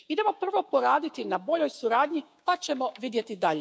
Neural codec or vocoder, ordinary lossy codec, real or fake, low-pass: codec, 16 kHz, 6 kbps, DAC; none; fake; none